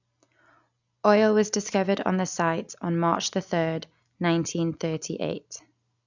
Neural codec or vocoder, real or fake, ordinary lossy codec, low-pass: none; real; none; 7.2 kHz